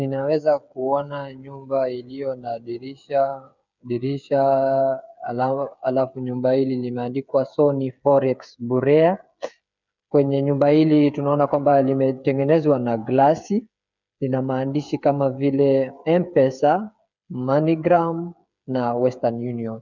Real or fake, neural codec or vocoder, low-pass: fake; codec, 16 kHz, 8 kbps, FreqCodec, smaller model; 7.2 kHz